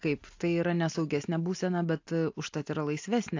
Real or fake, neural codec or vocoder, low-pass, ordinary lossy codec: real; none; 7.2 kHz; AAC, 48 kbps